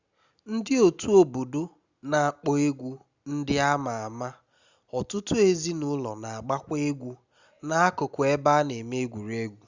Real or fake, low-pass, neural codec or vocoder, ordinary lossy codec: real; 7.2 kHz; none; Opus, 64 kbps